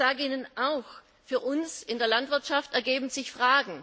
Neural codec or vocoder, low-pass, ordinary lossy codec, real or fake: none; none; none; real